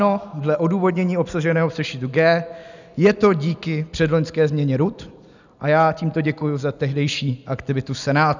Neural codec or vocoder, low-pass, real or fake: none; 7.2 kHz; real